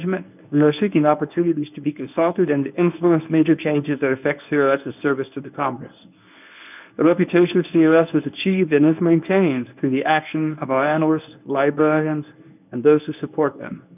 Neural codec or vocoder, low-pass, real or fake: codec, 24 kHz, 0.9 kbps, WavTokenizer, medium speech release version 1; 3.6 kHz; fake